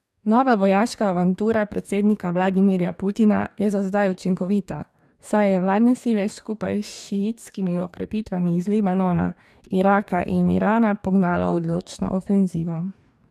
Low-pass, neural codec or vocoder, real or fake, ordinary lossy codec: 14.4 kHz; codec, 44.1 kHz, 2.6 kbps, DAC; fake; none